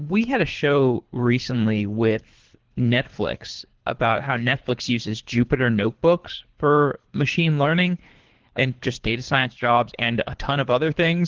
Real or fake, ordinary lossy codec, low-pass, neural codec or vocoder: fake; Opus, 16 kbps; 7.2 kHz; codec, 24 kHz, 3 kbps, HILCodec